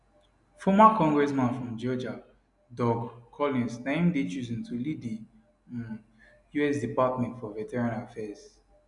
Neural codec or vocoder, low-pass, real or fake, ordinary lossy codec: none; 10.8 kHz; real; none